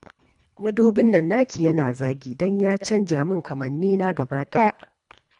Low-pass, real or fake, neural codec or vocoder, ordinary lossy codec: 10.8 kHz; fake; codec, 24 kHz, 1.5 kbps, HILCodec; none